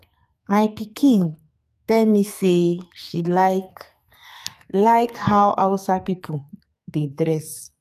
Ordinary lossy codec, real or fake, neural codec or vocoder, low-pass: none; fake; codec, 44.1 kHz, 2.6 kbps, SNAC; 14.4 kHz